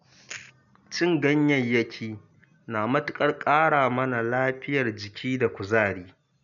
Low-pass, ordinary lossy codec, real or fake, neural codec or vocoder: 7.2 kHz; none; real; none